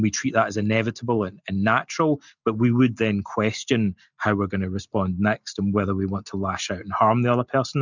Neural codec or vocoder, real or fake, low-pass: none; real; 7.2 kHz